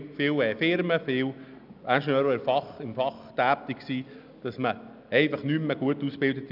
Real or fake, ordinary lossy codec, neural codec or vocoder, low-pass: real; none; none; 5.4 kHz